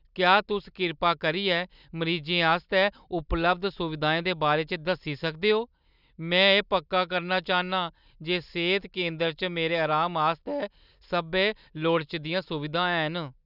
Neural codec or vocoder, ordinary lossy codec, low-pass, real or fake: none; none; 5.4 kHz; real